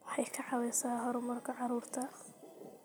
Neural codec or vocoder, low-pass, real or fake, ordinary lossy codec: vocoder, 44.1 kHz, 128 mel bands every 256 samples, BigVGAN v2; none; fake; none